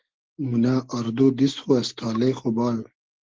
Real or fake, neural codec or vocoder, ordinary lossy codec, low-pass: real; none; Opus, 16 kbps; 7.2 kHz